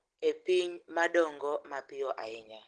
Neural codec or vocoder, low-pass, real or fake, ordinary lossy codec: none; 9.9 kHz; real; Opus, 16 kbps